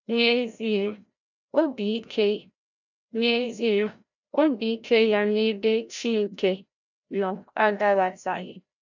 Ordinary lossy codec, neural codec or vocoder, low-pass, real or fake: none; codec, 16 kHz, 0.5 kbps, FreqCodec, larger model; 7.2 kHz; fake